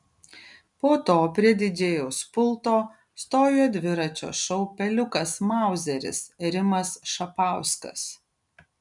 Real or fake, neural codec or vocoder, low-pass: real; none; 10.8 kHz